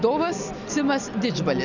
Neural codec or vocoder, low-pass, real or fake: none; 7.2 kHz; real